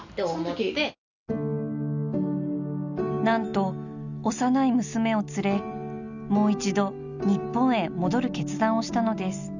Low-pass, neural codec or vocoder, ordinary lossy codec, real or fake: 7.2 kHz; none; none; real